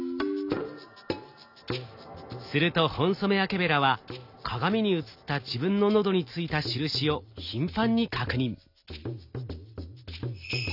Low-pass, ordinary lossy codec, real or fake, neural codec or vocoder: 5.4 kHz; none; real; none